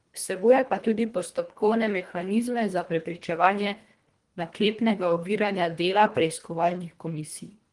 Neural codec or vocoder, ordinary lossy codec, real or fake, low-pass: codec, 24 kHz, 1.5 kbps, HILCodec; Opus, 24 kbps; fake; 10.8 kHz